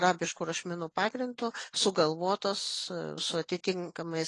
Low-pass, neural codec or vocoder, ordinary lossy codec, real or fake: 10.8 kHz; none; AAC, 32 kbps; real